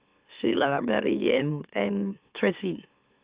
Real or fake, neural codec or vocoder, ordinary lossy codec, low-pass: fake; autoencoder, 44.1 kHz, a latent of 192 numbers a frame, MeloTTS; Opus, 64 kbps; 3.6 kHz